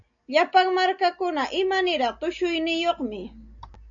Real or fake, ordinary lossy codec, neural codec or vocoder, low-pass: real; AAC, 64 kbps; none; 7.2 kHz